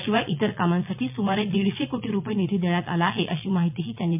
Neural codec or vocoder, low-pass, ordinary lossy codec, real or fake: vocoder, 22.05 kHz, 80 mel bands, Vocos; 3.6 kHz; MP3, 32 kbps; fake